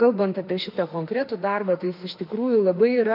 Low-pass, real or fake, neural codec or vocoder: 5.4 kHz; fake; autoencoder, 48 kHz, 32 numbers a frame, DAC-VAE, trained on Japanese speech